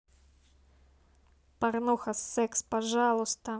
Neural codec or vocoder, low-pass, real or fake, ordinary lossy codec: none; none; real; none